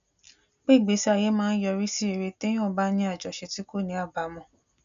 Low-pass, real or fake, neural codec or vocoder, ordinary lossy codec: 7.2 kHz; real; none; MP3, 96 kbps